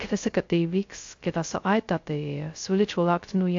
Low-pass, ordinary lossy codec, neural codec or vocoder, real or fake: 7.2 kHz; AAC, 48 kbps; codec, 16 kHz, 0.2 kbps, FocalCodec; fake